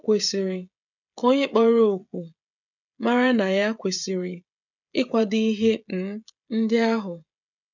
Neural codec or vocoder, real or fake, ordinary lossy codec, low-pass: codec, 16 kHz, 16 kbps, FreqCodec, smaller model; fake; none; 7.2 kHz